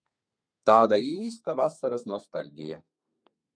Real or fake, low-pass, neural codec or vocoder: fake; 9.9 kHz; codec, 32 kHz, 1.9 kbps, SNAC